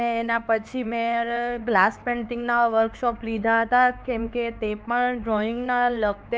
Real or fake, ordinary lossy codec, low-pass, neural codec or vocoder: fake; none; none; codec, 16 kHz, 4 kbps, X-Codec, HuBERT features, trained on LibriSpeech